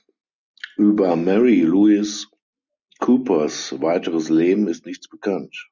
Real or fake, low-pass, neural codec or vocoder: real; 7.2 kHz; none